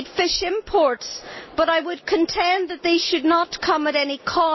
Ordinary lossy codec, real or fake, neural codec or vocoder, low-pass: MP3, 24 kbps; real; none; 7.2 kHz